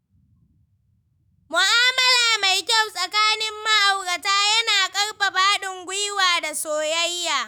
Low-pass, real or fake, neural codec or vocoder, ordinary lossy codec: none; fake; autoencoder, 48 kHz, 128 numbers a frame, DAC-VAE, trained on Japanese speech; none